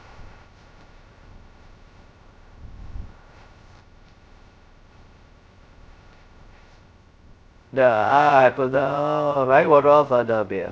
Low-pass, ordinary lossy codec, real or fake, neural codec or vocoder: none; none; fake; codec, 16 kHz, 0.2 kbps, FocalCodec